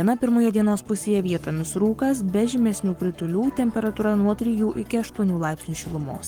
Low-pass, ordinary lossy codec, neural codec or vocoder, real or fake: 19.8 kHz; Opus, 24 kbps; codec, 44.1 kHz, 7.8 kbps, Pupu-Codec; fake